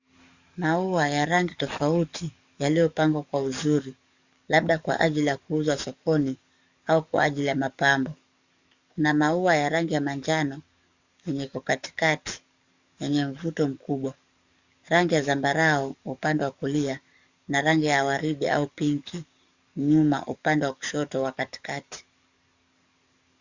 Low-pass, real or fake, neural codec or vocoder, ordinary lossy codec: 7.2 kHz; fake; codec, 44.1 kHz, 7.8 kbps, DAC; Opus, 64 kbps